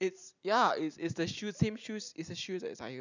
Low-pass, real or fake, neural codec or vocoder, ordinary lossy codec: 7.2 kHz; real; none; none